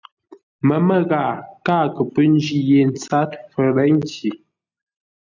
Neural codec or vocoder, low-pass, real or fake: none; 7.2 kHz; real